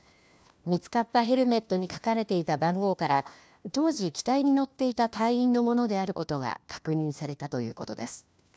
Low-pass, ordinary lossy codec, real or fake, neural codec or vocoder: none; none; fake; codec, 16 kHz, 1 kbps, FunCodec, trained on LibriTTS, 50 frames a second